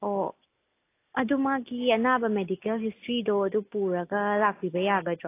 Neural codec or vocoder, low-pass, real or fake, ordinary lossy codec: none; 3.6 kHz; real; AAC, 24 kbps